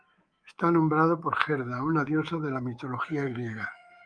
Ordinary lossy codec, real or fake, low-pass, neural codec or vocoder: Opus, 32 kbps; fake; 9.9 kHz; codec, 24 kHz, 3.1 kbps, DualCodec